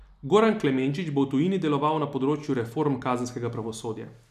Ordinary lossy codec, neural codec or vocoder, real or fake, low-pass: none; none; real; 14.4 kHz